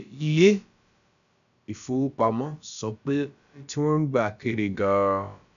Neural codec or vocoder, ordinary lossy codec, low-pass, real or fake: codec, 16 kHz, about 1 kbps, DyCAST, with the encoder's durations; none; 7.2 kHz; fake